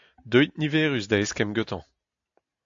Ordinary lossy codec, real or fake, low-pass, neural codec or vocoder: AAC, 64 kbps; real; 7.2 kHz; none